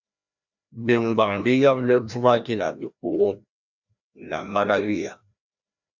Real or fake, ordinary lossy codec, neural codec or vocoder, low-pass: fake; Opus, 64 kbps; codec, 16 kHz, 1 kbps, FreqCodec, larger model; 7.2 kHz